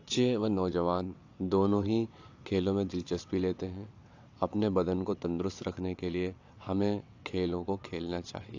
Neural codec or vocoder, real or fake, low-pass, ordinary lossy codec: vocoder, 22.05 kHz, 80 mel bands, WaveNeXt; fake; 7.2 kHz; AAC, 48 kbps